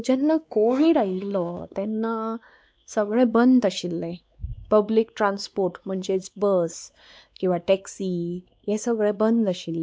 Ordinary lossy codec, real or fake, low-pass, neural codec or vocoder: none; fake; none; codec, 16 kHz, 2 kbps, X-Codec, WavLM features, trained on Multilingual LibriSpeech